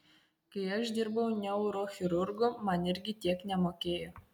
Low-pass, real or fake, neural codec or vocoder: 19.8 kHz; real; none